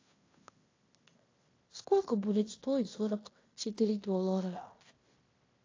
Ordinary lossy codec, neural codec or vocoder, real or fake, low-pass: AAC, 32 kbps; codec, 16 kHz in and 24 kHz out, 0.9 kbps, LongCat-Audio-Codec, fine tuned four codebook decoder; fake; 7.2 kHz